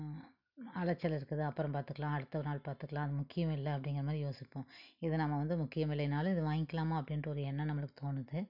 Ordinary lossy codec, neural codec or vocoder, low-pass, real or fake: none; none; 5.4 kHz; real